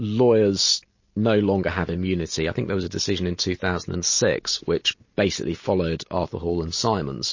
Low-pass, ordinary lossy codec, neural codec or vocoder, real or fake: 7.2 kHz; MP3, 32 kbps; none; real